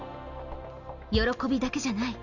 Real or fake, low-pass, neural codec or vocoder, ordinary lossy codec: real; 7.2 kHz; none; none